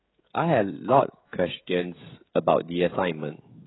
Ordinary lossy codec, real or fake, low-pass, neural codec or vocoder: AAC, 16 kbps; fake; 7.2 kHz; codec, 16 kHz, 16 kbps, FreqCodec, smaller model